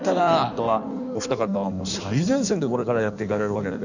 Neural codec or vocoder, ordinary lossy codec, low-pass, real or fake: codec, 16 kHz in and 24 kHz out, 1.1 kbps, FireRedTTS-2 codec; none; 7.2 kHz; fake